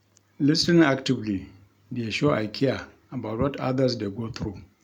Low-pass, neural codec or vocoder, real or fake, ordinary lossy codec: 19.8 kHz; none; real; none